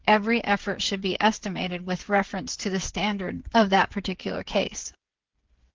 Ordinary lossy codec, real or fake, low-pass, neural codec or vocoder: Opus, 16 kbps; fake; 7.2 kHz; codec, 16 kHz, 8 kbps, FreqCodec, smaller model